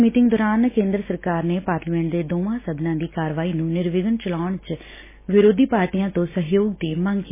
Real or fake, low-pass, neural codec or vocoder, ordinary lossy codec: real; 3.6 kHz; none; MP3, 16 kbps